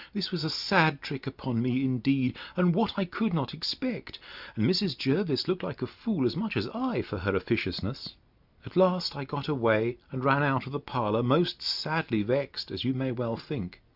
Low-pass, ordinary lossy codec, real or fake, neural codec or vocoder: 5.4 kHz; Opus, 64 kbps; real; none